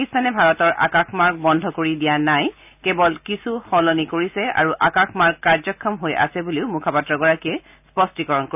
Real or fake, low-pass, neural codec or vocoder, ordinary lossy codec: real; 3.6 kHz; none; none